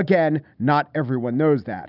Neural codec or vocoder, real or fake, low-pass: none; real; 5.4 kHz